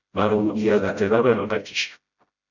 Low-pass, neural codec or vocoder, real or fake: 7.2 kHz; codec, 16 kHz, 0.5 kbps, FreqCodec, smaller model; fake